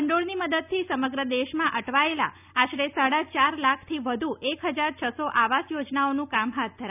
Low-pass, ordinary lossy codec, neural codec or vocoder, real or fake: 3.6 kHz; none; none; real